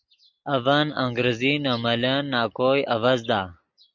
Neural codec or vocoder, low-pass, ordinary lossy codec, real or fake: none; 7.2 kHz; MP3, 64 kbps; real